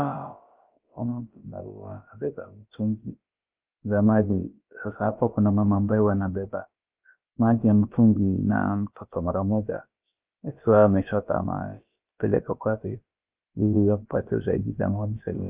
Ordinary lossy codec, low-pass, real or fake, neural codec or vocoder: Opus, 24 kbps; 3.6 kHz; fake; codec, 16 kHz, about 1 kbps, DyCAST, with the encoder's durations